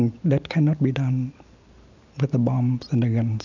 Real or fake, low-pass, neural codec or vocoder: real; 7.2 kHz; none